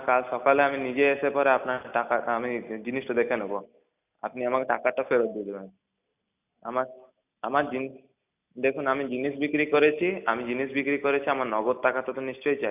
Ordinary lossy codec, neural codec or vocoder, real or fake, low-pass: none; none; real; 3.6 kHz